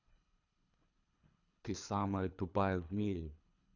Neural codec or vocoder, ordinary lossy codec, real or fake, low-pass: codec, 24 kHz, 3 kbps, HILCodec; none; fake; 7.2 kHz